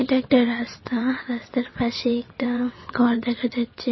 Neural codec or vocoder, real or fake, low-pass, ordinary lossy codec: vocoder, 22.05 kHz, 80 mel bands, WaveNeXt; fake; 7.2 kHz; MP3, 24 kbps